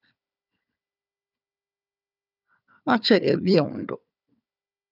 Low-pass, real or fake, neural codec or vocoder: 5.4 kHz; fake; codec, 16 kHz, 4 kbps, FunCodec, trained on Chinese and English, 50 frames a second